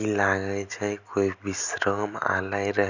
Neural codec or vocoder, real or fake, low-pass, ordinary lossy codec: none; real; 7.2 kHz; none